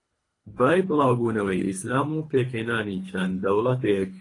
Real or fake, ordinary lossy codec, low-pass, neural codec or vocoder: fake; AAC, 32 kbps; 10.8 kHz; codec, 24 kHz, 3 kbps, HILCodec